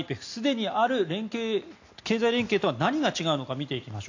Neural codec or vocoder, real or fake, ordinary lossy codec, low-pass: none; real; AAC, 48 kbps; 7.2 kHz